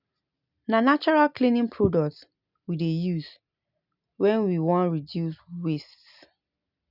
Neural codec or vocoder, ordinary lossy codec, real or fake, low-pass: none; none; real; 5.4 kHz